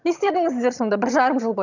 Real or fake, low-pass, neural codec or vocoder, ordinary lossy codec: fake; 7.2 kHz; vocoder, 22.05 kHz, 80 mel bands, HiFi-GAN; none